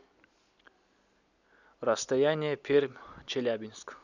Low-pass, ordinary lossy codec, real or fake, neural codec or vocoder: 7.2 kHz; none; real; none